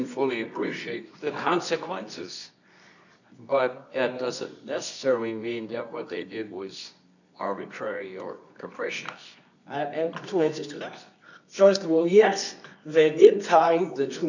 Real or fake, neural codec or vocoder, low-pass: fake; codec, 24 kHz, 0.9 kbps, WavTokenizer, medium music audio release; 7.2 kHz